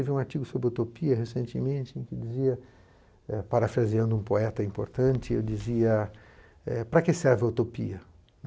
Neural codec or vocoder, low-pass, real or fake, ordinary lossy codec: none; none; real; none